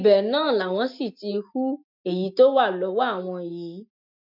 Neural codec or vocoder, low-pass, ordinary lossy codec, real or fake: none; 5.4 kHz; MP3, 48 kbps; real